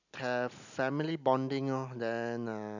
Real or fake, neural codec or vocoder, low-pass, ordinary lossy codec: real; none; 7.2 kHz; none